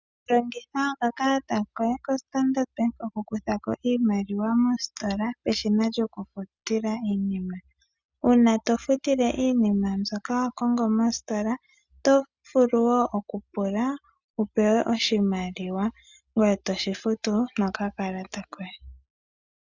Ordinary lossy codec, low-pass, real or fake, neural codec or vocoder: Opus, 64 kbps; 7.2 kHz; real; none